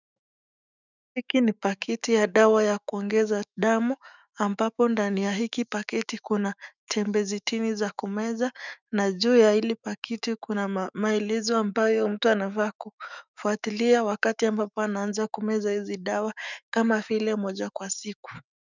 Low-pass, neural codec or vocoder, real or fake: 7.2 kHz; autoencoder, 48 kHz, 128 numbers a frame, DAC-VAE, trained on Japanese speech; fake